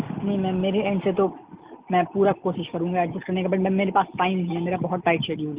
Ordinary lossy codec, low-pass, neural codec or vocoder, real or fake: Opus, 24 kbps; 3.6 kHz; none; real